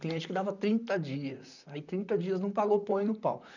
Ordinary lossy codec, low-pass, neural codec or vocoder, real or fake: none; 7.2 kHz; vocoder, 44.1 kHz, 128 mel bands, Pupu-Vocoder; fake